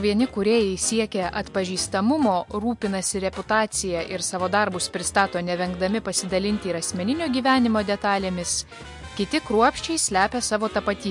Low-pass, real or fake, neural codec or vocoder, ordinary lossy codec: 10.8 kHz; real; none; MP3, 64 kbps